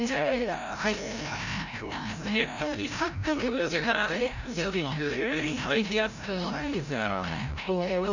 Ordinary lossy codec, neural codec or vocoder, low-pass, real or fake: none; codec, 16 kHz, 0.5 kbps, FreqCodec, larger model; 7.2 kHz; fake